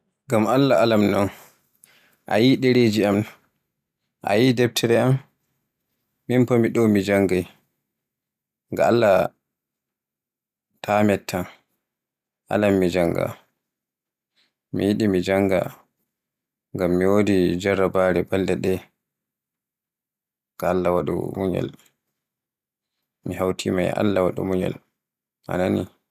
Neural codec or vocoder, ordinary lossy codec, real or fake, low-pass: none; none; real; 14.4 kHz